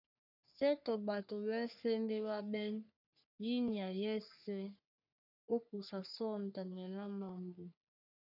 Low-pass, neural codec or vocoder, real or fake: 5.4 kHz; codec, 44.1 kHz, 3.4 kbps, Pupu-Codec; fake